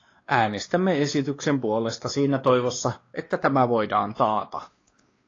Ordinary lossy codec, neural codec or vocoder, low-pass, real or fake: AAC, 32 kbps; codec, 16 kHz, 2 kbps, X-Codec, WavLM features, trained on Multilingual LibriSpeech; 7.2 kHz; fake